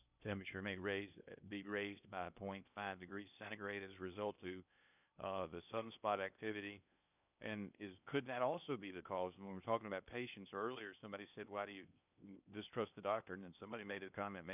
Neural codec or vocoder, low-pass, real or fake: codec, 16 kHz in and 24 kHz out, 0.8 kbps, FocalCodec, streaming, 65536 codes; 3.6 kHz; fake